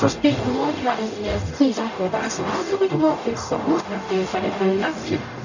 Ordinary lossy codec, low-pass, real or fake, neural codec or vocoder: none; 7.2 kHz; fake; codec, 44.1 kHz, 0.9 kbps, DAC